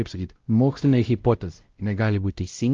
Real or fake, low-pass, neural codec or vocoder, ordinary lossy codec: fake; 7.2 kHz; codec, 16 kHz, 0.5 kbps, X-Codec, WavLM features, trained on Multilingual LibriSpeech; Opus, 32 kbps